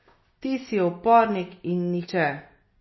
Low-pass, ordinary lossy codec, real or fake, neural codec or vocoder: 7.2 kHz; MP3, 24 kbps; real; none